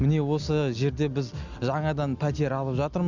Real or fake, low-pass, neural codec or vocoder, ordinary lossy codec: real; 7.2 kHz; none; none